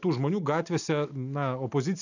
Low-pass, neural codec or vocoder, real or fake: 7.2 kHz; none; real